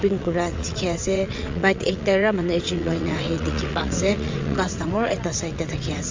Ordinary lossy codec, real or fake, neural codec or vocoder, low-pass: MP3, 48 kbps; fake; vocoder, 22.05 kHz, 80 mel bands, WaveNeXt; 7.2 kHz